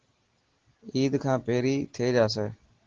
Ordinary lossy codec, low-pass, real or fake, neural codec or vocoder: Opus, 16 kbps; 7.2 kHz; real; none